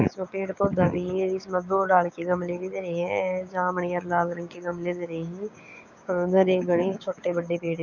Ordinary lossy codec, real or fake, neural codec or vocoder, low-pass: none; fake; codec, 16 kHz, 6 kbps, DAC; 7.2 kHz